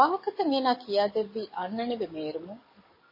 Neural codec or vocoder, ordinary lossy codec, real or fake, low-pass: codec, 44.1 kHz, 7.8 kbps, DAC; MP3, 24 kbps; fake; 5.4 kHz